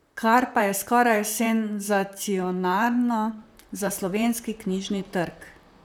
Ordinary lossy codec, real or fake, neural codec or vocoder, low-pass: none; fake; vocoder, 44.1 kHz, 128 mel bands, Pupu-Vocoder; none